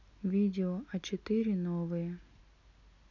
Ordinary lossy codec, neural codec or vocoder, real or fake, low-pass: none; none; real; 7.2 kHz